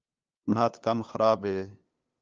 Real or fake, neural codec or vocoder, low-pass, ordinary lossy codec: fake; codec, 16 kHz, 2 kbps, FunCodec, trained on LibriTTS, 25 frames a second; 7.2 kHz; Opus, 24 kbps